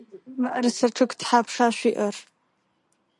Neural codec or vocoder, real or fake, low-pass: none; real; 10.8 kHz